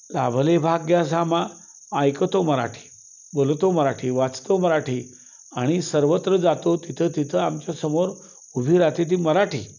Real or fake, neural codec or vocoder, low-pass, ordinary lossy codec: real; none; 7.2 kHz; none